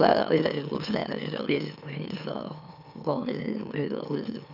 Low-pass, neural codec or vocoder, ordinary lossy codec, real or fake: 5.4 kHz; autoencoder, 44.1 kHz, a latent of 192 numbers a frame, MeloTTS; none; fake